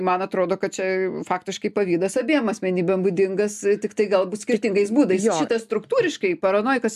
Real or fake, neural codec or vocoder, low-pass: real; none; 14.4 kHz